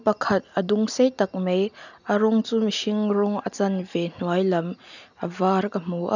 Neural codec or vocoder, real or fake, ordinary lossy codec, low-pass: none; real; none; 7.2 kHz